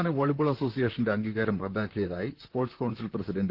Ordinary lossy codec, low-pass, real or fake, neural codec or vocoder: Opus, 16 kbps; 5.4 kHz; fake; vocoder, 44.1 kHz, 128 mel bands, Pupu-Vocoder